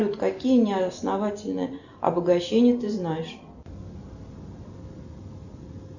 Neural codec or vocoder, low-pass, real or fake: none; 7.2 kHz; real